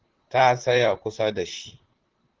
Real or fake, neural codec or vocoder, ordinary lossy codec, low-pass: fake; vocoder, 44.1 kHz, 128 mel bands, Pupu-Vocoder; Opus, 16 kbps; 7.2 kHz